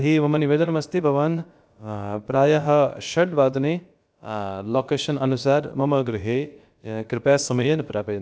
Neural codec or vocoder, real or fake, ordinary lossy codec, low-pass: codec, 16 kHz, about 1 kbps, DyCAST, with the encoder's durations; fake; none; none